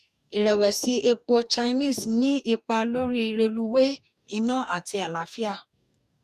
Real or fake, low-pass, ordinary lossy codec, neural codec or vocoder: fake; 14.4 kHz; none; codec, 44.1 kHz, 2.6 kbps, DAC